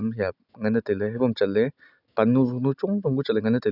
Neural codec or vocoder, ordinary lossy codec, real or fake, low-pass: none; none; real; 5.4 kHz